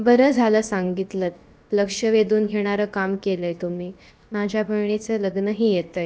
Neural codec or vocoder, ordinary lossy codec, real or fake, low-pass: codec, 16 kHz, about 1 kbps, DyCAST, with the encoder's durations; none; fake; none